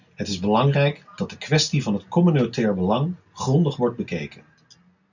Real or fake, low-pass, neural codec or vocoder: real; 7.2 kHz; none